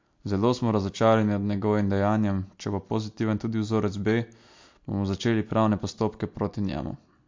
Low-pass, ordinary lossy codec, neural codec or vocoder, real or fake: 7.2 kHz; MP3, 48 kbps; none; real